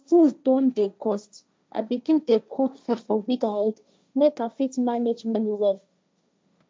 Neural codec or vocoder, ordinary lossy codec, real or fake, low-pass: codec, 16 kHz, 1.1 kbps, Voila-Tokenizer; none; fake; none